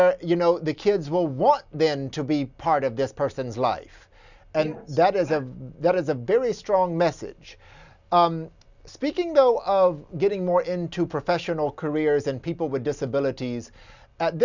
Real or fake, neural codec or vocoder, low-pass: real; none; 7.2 kHz